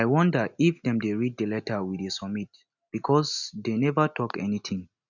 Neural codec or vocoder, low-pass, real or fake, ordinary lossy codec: none; 7.2 kHz; real; none